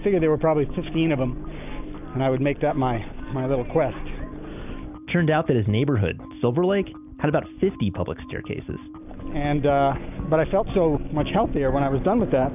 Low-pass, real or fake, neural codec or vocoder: 3.6 kHz; real; none